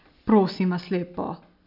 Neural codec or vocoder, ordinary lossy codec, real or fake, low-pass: none; none; real; 5.4 kHz